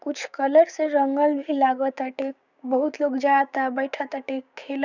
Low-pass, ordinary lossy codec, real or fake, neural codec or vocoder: 7.2 kHz; none; fake; codec, 16 kHz, 6 kbps, DAC